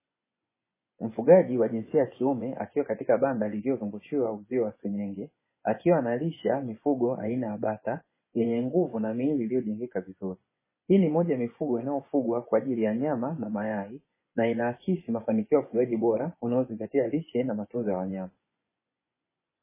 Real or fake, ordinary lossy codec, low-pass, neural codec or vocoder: fake; MP3, 16 kbps; 3.6 kHz; vocoder, 24 kHz, 100 mel bands, Vocos